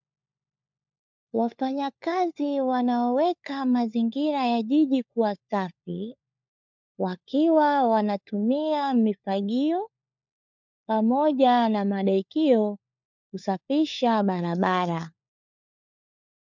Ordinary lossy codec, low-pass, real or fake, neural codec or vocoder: MP3, 64 kbps; 7.2 kHz; fake; codec, 16 kHz, 4 kbps, FunCodec, trained on LibriTTS, 50 frames a second